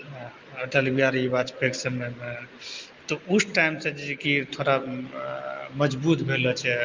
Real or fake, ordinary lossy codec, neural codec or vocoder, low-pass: real; Opus, 32 kbps; none; 7.2 kHz